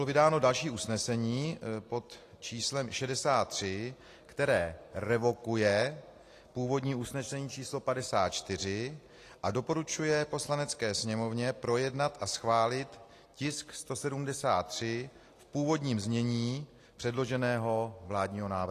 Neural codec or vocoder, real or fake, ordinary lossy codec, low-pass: none; real; AAC, 48 kbps; 14.4 kHz